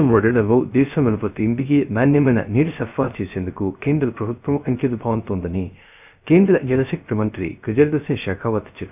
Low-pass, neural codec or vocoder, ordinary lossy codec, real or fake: 3.6 kHz; codec, 16 kHz, 0.3 kbps, FocalCodec; none; fake